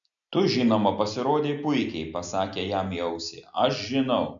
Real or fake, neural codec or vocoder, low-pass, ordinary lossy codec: real; none; 7.2 kHz; MP3, 64 kbps